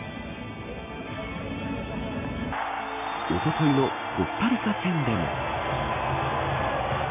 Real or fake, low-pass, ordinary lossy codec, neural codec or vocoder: real; 3.6 kHz; AAC, 24 kbps; none